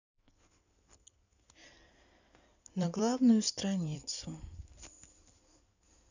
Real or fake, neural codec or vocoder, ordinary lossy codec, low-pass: fake; codec, 16 kHz in and 24 kHz out, 2.2 kbps, FireRedTTS-2 codec; none; 7.2 kHz